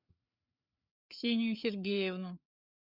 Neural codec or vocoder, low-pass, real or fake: codec, 16 kHz, 4 kbps, FreqCodec, larger model; 5.4 kHz; fake